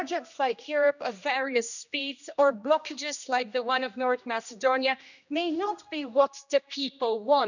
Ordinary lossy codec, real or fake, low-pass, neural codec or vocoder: none; fake; 7.2 kHz; codec, 16 kHz, 1 kbps, X-Codec, HuBERT features, trained on general audio